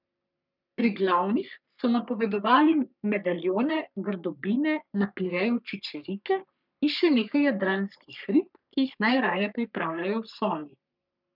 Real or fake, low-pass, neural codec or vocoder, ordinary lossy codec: fake; 5.4 kHz; codec, 44.1 kHz, 3.4 kbps, Pupu-Codec; none